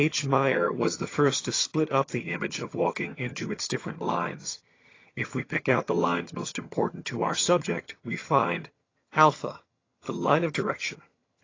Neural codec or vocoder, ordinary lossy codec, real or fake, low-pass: vocoder, 22.05 kHz, 80 mel bands, HiFi-GAN; AAC, 32 kbps; fake; 7.2 kHz